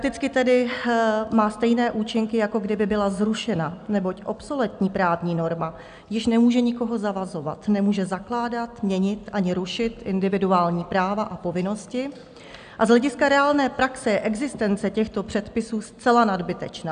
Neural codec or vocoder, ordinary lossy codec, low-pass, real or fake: none; AAC, 96 kbps; 9.9 kHz; real